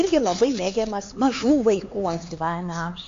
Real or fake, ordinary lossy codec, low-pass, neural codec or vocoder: fake; AAC, 48 kbps; 7.2 kHz; codec, 16 kHz, 4 kbps, X-Codec, HuBERT features, trained on LibriSpeech